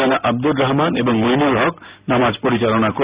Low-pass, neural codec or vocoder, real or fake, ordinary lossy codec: 3.6 kHz; none; real; Opus, 64 kbps